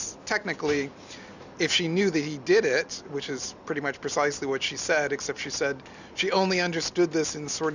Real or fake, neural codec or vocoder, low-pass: real; none; 7.2 kHz